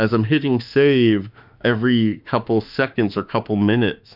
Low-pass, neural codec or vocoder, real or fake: 5.4 kHz; autoencoder, 48 kHz, 32 numbers a frame, DAC-VAE, trained on Japanese speech; fake